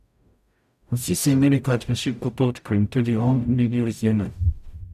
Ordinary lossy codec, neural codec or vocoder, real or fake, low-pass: none; codec, 44.1 kHz, 0.9 kbps, DAC; fake; 14.4 kHz